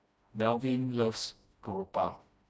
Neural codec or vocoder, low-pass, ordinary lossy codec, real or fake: codec, 16 kHz, 1 kbps, FreqCodec, smaller model; none; none; fake